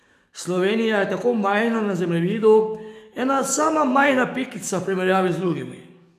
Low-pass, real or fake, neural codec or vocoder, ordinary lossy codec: 14.4 kHz; fake; codec, 44.1 kHz, 7.8 kbps, DAC; none